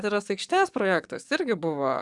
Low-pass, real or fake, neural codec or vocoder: 10.8 kHz; fake; codec, 44.1 kHz, 7.8 kbps, DAC